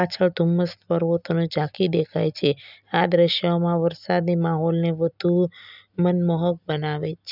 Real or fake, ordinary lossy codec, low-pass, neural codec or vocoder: real; none; 5.4 kHz; none